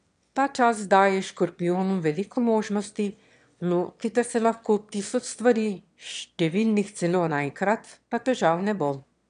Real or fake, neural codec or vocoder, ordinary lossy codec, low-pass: fake; autoencoder, 22.05 kHz, a latent of 192 numbers a frame, VITS, trained on one speaker; none; 9.9 kHz